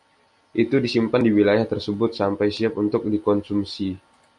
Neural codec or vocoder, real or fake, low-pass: none; real; 10.8 kHz